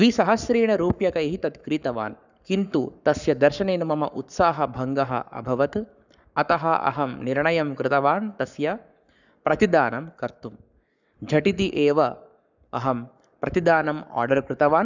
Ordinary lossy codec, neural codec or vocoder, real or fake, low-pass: none; codec, 44.1 kHz, 7.8 kbps, Pupu-Codec; fake; 7.2 kHz